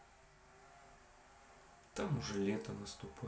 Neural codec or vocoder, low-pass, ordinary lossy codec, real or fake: none; none; none; real